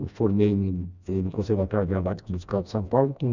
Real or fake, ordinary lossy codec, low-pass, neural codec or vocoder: fake; none; 7.2 kHz; codec, 16 kHz, 1 kbps, FreqCodec, smaller model